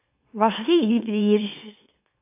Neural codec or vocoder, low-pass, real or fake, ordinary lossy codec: autoencoder, 44.1 kHz, a latent of 192 numbers a frame, MeloTTS; 3.6 kHz; fake; AAC, 32 kbps